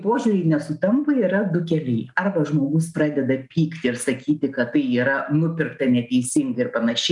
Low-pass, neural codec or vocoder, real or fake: 10.8 kHz; none; real